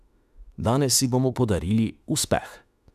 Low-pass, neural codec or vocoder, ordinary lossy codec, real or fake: 14.4 kHz; autoencoder, 48 kHz, 32 numbers a frame, DAC-VAE, trained on Japanese speech; none; fake